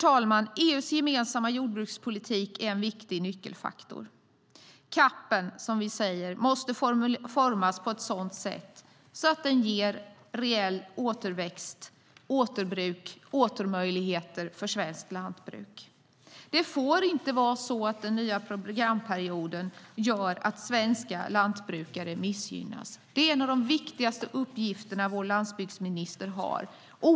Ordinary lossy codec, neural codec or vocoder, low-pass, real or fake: none; none; none; real